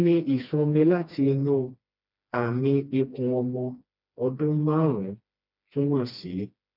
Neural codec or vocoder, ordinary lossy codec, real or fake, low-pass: codec, 16 kHz, 2 kbps, FreqCodec, smaller model; none; fake; 5.4 kHz